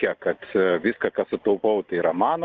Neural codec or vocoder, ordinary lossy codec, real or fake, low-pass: none; Opus, 32 kbps; real; 7.2 kHz